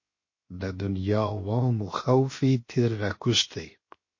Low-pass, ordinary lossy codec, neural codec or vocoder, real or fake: 7.2 kHz; MP3, 32 kbps; codec, 16 kHz, 0.7 kbps, FocalCodec; fake